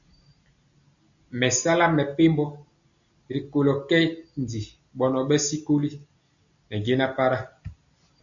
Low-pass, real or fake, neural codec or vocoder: 7.2 kHz; real; none